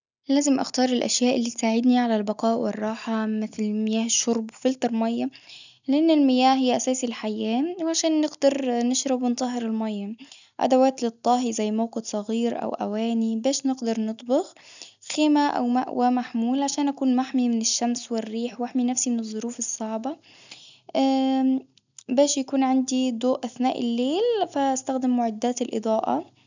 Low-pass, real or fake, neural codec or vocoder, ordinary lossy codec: 7.2 kHz; real; none; none